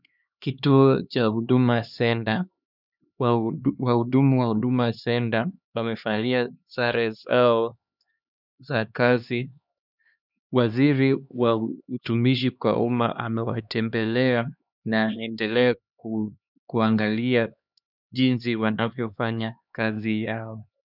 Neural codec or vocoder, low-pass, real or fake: codec, 16 kHz, 2 kbps, X-Codec, HuBERT features, trained on LibriSpeech; 5.4 kHz; fake